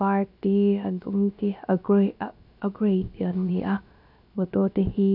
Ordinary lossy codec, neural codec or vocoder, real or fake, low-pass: none; codec, 16 kHz, about 1 kbps, DyCAST, with the encoder's durations; fake; 5.4 kHz